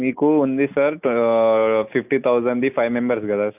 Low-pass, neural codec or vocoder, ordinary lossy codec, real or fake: 3.6 kHz; none; none; real